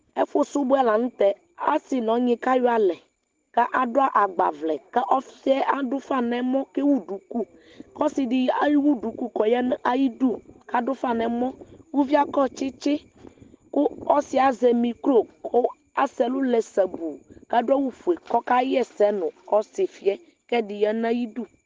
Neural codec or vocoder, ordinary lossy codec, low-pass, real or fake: none; Opus, 16 kbps; 7.2 kHz; real